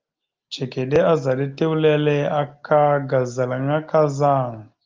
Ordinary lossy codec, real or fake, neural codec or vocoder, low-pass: Opus, 32 kbps; real; none; 7.2 kHz